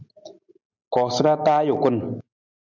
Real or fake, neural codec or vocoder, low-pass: real; none; 7.2 kHz